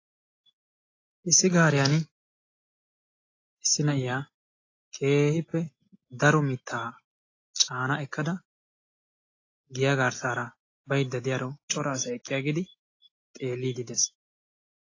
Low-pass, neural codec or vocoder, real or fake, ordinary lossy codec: 7.2 kHz; none; real; AAC, 32 kbps